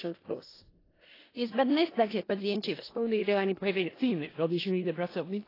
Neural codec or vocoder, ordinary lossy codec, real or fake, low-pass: codec, 16 kHz in and 24 kHz out, 0.4 kbps, LongCat-Audio-Codec, four codebook decoder; AAC, 24 kbps; fake; 5.4 kHz